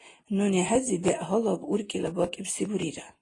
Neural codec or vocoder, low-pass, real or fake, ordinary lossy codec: none; 10.8 kHz; real; AAC, 32 kbps